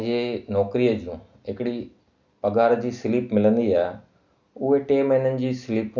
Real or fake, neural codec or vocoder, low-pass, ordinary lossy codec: real; none; 7.2 kHz; none